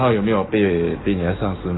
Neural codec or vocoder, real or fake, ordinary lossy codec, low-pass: codec, 44.1 kHz, 7.8 kbps, DAC; fake; AAC, 16 kbps; 7.2 kHz